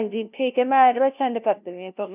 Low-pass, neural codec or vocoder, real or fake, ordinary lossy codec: 3.6 kHz; codec, 16 kHz, 0.5 kbps, FunCodec, trained on LibriTTS, 25 frames a second; fake; none